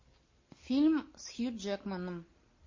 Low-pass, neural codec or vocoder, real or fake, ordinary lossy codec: 7.2 kHz; none; real; MP3, 32 kbps